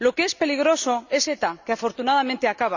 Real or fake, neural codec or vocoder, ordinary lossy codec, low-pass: real; none; none; 7.2 kHz